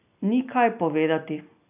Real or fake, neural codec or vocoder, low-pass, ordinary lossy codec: real; none; 3.6 kHz; none